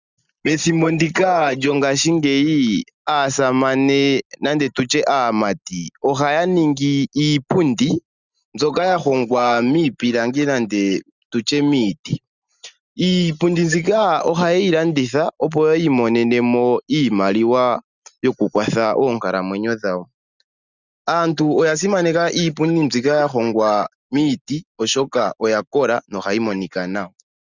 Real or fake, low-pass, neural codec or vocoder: real; 7.2 kHz; none